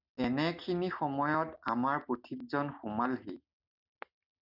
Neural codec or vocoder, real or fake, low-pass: none; real; 5.4 kHz